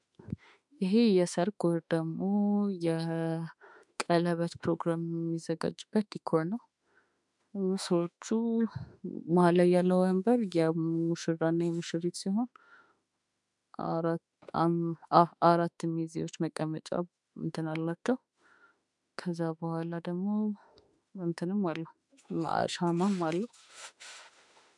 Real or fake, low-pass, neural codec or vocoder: fake; 10.8 kHz; autoencoder, 48 kHz, 32 numbers a frame, DAC-VAE, trained on Japanese speech